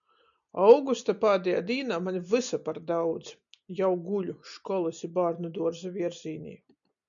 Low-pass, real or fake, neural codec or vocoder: 7.2 kHz; real; none